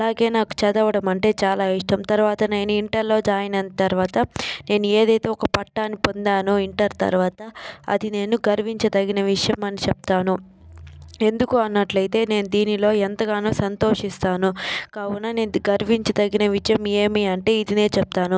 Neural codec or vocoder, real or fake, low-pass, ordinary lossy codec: none; real; none; none